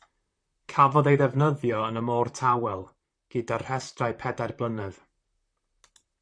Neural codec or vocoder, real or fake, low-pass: vocoder, 44.1 kHz, 128 mel bands, Pupu-Vocoder; fake; 9.9 kHz